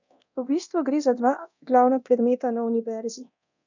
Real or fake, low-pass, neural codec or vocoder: fake; 7.2 kHz; codec, 24 kHz, 0.9 kbps, DualCodec